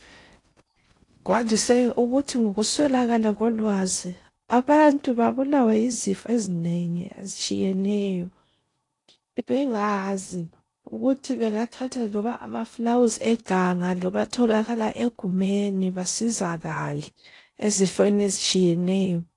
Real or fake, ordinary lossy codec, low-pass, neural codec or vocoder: fake; AAC, 48 kbps; 10.8 kHz; codec, 16 kHz in and 24 kHz out, 0.6 kbps, FocalCodec, streaming, 4096 codes